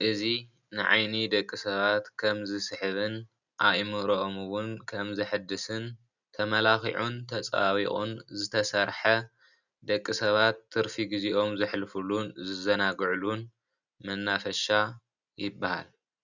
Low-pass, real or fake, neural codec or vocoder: 7.2 kHz; real; none